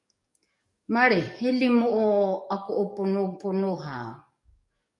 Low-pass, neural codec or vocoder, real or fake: 10.8 kHz; codec, 44.1 kHz, 7.8 kbps, DAC; fake